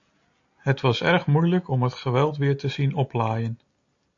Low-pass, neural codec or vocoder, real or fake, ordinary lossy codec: 7.2 kHz; none; real; AAC, 48 kbps